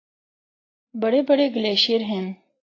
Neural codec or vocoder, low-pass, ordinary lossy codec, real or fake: none; 7.2 kHz; AAC, 32 kbps; real